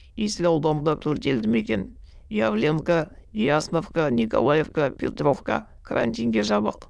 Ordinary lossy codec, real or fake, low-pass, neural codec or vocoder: none; fake; none; autoencoder, 22.05 kHz, a latent of 192 numbers a frame, VITS, trained on many speakers